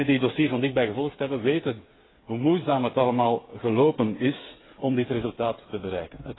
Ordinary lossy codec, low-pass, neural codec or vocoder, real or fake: AAC, 16 kbps; 7.2 kHz; codec, 16 kHz, 8 kbps, FreqCodec, smaller model; fake